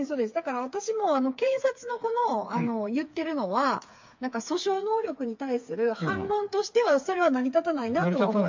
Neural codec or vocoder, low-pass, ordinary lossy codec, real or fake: codec, 16 kHz, 4 kbps, FreqCodec, smaller model; 7.2 kHz; MP3, 48 kbps; fake